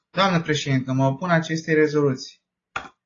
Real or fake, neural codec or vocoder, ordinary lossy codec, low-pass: real; none; AAC, 32 kbps; 7.2 kHz